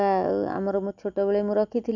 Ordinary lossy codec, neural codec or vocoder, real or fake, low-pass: none; none; real; 7.2 kHz